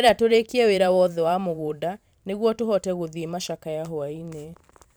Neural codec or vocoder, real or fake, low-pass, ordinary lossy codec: vocoder, 44.1 kHz, 128 mel bands every 512 samples, BigVGAN v2; fake; none; none